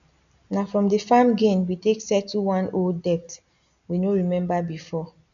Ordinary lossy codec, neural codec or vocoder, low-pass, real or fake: none; none; 7.2 kHz; real